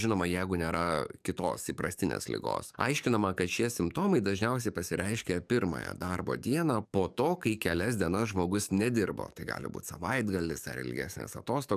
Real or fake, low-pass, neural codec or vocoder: fake; 14.4 kHz; codec, 44.1 kHz, 7.8 kbps, DAC